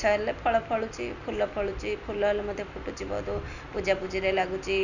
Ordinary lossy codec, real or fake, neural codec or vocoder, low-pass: none; real; none; 7.2 kHz